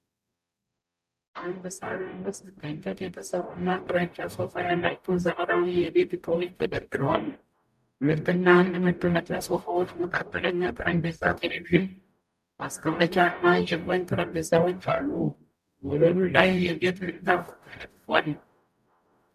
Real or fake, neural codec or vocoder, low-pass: fake; codec, 44.1 kHz, 0.9 kbps, DAC; 14.4 kHz